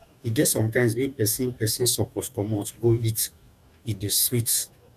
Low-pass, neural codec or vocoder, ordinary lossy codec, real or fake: 14.4 kHz; codec, 44.1 kHz, 2.6 kbps, DAC; none; fake